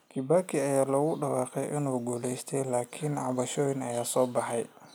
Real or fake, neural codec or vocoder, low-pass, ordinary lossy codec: real; none; none; none